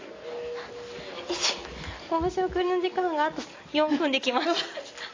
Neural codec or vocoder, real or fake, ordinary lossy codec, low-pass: none; real; AAC, 32 kbps; 7.2 kHz